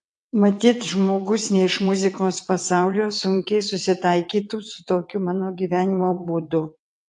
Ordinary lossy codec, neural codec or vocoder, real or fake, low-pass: AAC, 64 kbps; vocoder, 22.05 kHz, 80 mel bands, WaveNeXt; fake; 9.9 kHz